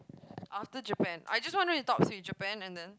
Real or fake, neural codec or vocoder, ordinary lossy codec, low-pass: real; none; none; none